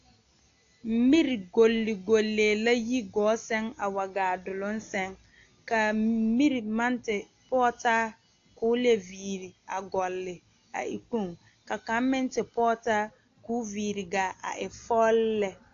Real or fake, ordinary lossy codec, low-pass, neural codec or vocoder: real; AAC, 48 kbps; 7.2 kHz; none